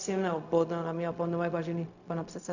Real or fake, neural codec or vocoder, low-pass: fake; codec, 16 kHz, 0.4 kbps, LongCat-Audio-Codec; 7.2 kHz